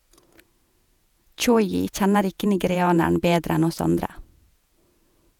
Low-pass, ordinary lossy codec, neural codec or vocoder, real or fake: 19.8 kHz; none; vocoder, 48 kHz, 128 mel bands, Vocos; fake